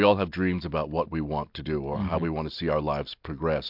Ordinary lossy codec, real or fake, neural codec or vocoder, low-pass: MP3, 48 kbps; real; none; 5.4 kHz